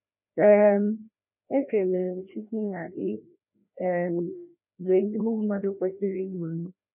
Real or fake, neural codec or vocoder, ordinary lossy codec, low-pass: fake; codec, 16 kHz, 1 kbps, FreqCodec, larger model; none; 3.6 kHz